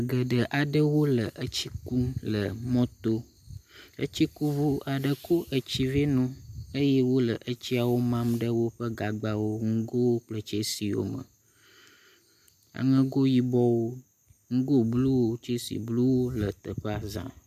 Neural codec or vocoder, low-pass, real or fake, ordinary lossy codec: none; 14.4 kHz; real; MP3, 96 kbps